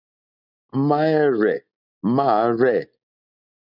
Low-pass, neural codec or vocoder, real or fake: 5.4 kHz; none; real